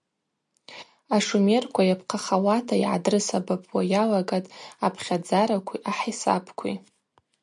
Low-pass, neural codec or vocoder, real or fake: 10.8 kHz; none; real